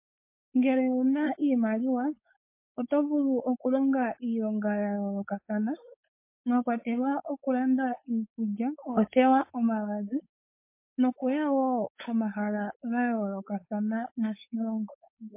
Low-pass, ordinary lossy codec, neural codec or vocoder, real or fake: 3.6 kHz; MP3, 24 kbps; codec, 16 kHz, 4.8 kbps, FACodec; fake